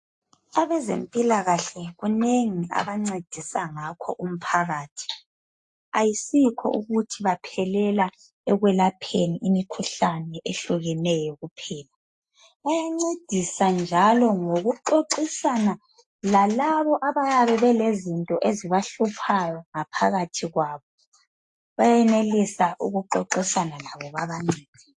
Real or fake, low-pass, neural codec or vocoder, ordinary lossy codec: real; 10.8 kHz; none; AAC, 64 kbps